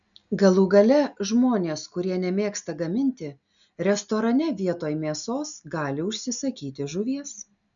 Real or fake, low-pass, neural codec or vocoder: real; 7.2 kHz; none